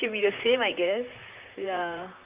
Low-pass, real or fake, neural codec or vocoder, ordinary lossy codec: 3.6 kHz; fake; vocoder, 44.1 kHz, 128 mel bands, Pupu-Vocoder; Opus, 24 kbps